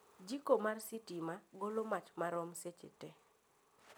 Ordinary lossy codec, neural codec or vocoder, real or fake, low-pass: none; none; real; none